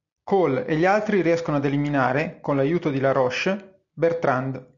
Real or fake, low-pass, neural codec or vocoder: real; 7.2 kHz; none